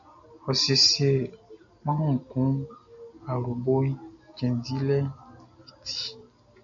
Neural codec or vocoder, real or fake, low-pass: none; real; 7.2 kHz